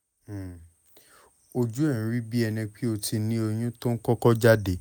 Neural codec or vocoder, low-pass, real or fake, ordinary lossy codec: none; none; real; none